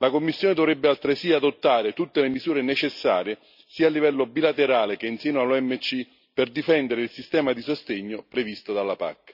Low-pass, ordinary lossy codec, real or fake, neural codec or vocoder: 5.4 kHz; none; real; none